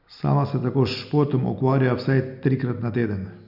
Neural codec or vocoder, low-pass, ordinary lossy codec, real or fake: none; 5.4 kHz; none; real